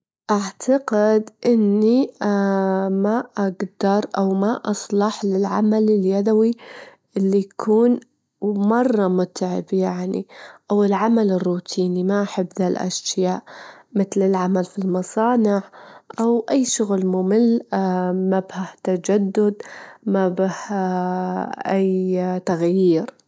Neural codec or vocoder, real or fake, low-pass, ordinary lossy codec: none; real; none; none